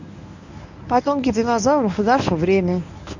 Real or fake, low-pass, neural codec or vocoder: fake; 7.2 kHz; codec, 24 kHz, 0.9 kbps, WavTokenizer, medium speech release version 1